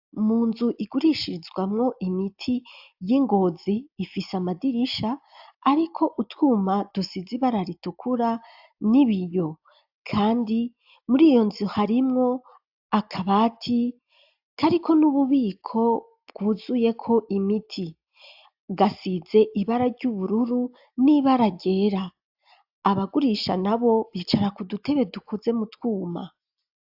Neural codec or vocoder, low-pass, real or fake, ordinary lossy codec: none; 5.4 kHz; real; AAC, 48 kbps